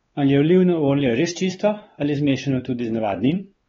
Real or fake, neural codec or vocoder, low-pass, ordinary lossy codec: fake; codec, 16 kHz, 4 kbps, X-Codec, WavLM features, trained on Multilingual LibriSpeech; 7.2 kHz; AAC, 24 kbps